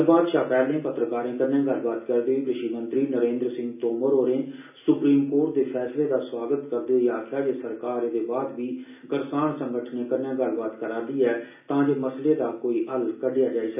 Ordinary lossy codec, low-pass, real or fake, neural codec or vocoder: none; 3.6 kHz; real; none